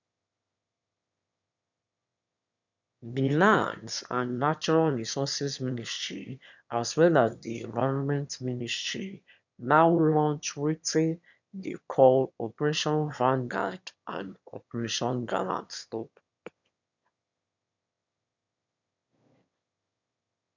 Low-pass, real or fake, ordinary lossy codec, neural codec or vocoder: 7.2 kHz; fake; none; autoencoder, 22.05 kHz, a latent of 192 numbers a frame, VITS, trained on one speaker